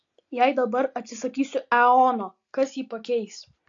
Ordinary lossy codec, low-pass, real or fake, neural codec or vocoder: AAC, 48 kbps; 7.2 kHz; real; none